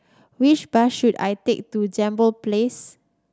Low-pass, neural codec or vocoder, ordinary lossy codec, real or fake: none; none; none; real